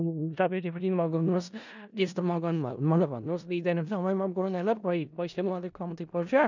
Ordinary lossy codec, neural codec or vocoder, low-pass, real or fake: none; codec, 16 kHz in and 24 kHz out, 0.4 kbps, LongCat-Audio-Codec, four codebook decoder; 7.2 kHz; fake